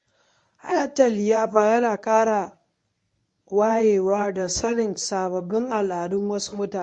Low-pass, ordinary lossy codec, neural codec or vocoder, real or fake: 10.8 kHz; MP3, 48 kbps; codec, 24 kHz, 0.9 kbps, WavTokenizer, medium speech release version 1; fake